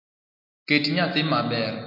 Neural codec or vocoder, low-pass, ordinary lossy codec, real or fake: none; 5.4 kHz; MP3, 48 kbps; real